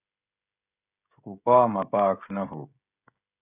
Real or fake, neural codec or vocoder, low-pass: fake; codec, 16 kHz, 16 kbps, FreqCodec, smaller model; 3.6 kHz